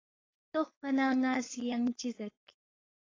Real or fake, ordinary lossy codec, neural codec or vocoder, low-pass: fake; AAC, 32 kbps; codec, 16 kHz, 4.8 kbps, FACodec; 7.2 kHz